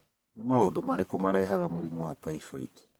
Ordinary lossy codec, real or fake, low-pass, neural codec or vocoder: none; fake; none; codec, 44.1 kHz, 1.7 kbps, Pupu-Codec